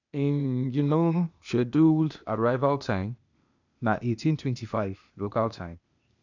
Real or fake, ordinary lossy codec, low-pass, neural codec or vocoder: fake; none; 7.2 kHz; codec, 16 kHz, 0.8 kbps, ZipCodec